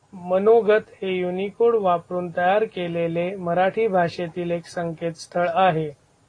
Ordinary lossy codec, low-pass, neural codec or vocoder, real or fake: AAC, 32 kbps; 9.9 kHz; none; real